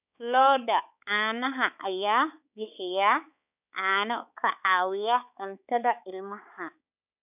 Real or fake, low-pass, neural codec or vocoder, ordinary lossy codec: fake; 3.6 kHz; codec, 16 kHz, 4 kbps, X-Codec, HuBERT features, trained on balanced general audio; none